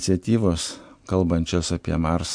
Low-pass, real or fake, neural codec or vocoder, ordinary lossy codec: 9.9 kHz; real; none; MP3, 64 kbps